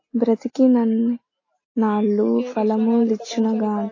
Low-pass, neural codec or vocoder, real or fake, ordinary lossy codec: 7.2 kHz; none; real; AAC, 32 kbps